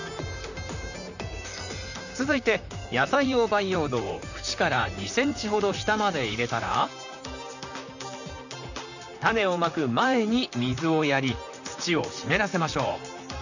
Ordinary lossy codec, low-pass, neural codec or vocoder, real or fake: none; 7.2 kHz; vocoder, 44.1 kHz, 128 mel bands, Pupu-Vocoder; fake